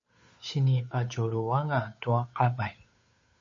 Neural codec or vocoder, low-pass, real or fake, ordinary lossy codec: codec, 16 kHz, 8 kbps, FunCodec, trained on Chinese and English, 25 frames a second; 7.2 kHz; fake; MP3, 32 kbps